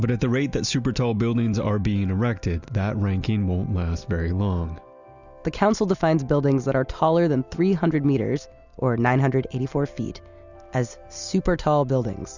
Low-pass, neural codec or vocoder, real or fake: 7.2 kHz; none; real